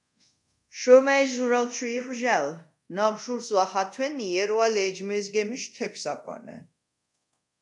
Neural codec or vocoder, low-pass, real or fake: codec, 24 kHz, 0.5 kbps, DualCodec; 10.8 kHz; fake